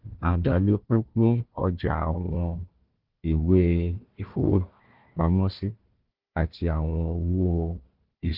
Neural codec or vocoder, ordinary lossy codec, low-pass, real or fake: codec, 16 kHz, 1 kbps, FunCodec, trained on Chinese and English, 50 frames a second; Opus, 16 kbps; 5.4 kHz; fake